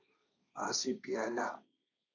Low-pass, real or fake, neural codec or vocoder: 7.2 kHz; fake; codec, 16 kHz, 1.1 kbps, Voila-Tokenizer